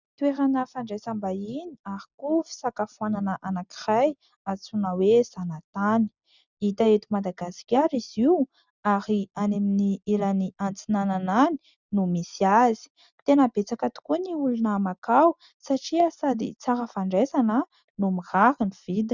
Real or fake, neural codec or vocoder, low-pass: real; none; 7.2 kHz